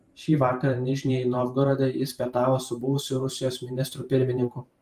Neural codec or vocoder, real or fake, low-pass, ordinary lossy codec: vocoder, 44.1 kHz, 128 mel bands every 512 samples, BigVGAN v2; fake; 14.4 kHz; Opus, 32 kbps